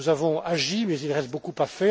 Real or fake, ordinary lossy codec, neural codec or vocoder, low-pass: real; none; none; none